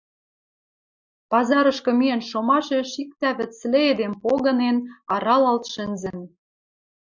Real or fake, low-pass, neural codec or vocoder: real; 7.2 kHz; none